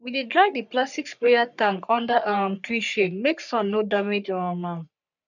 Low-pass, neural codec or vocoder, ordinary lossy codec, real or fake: 7.2 kHz; codec, 44.1 kHz, 3.4 kbps, Pupu-Codec; none; fake